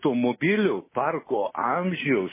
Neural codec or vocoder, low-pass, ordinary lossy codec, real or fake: none; 3.6 kHz; MP3, 16 kbps; real